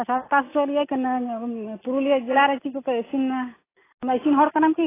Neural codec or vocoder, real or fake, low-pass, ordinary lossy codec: none; real; 3.6 kHz; AAC, 16 kbps